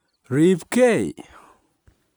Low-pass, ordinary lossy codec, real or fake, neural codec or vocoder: none; none; real; none